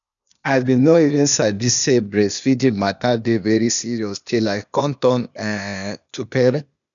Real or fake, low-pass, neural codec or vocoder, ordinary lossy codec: fake; 7.2 kHz; codec, 16 kHz, 0.8 kbps, ZipCodec; none